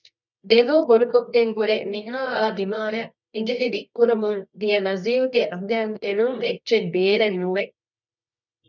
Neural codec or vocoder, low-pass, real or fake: codec, 24 kHz, 0.9 kbps, WavTokenizer, medium music audio release; 7.2 kHz; fake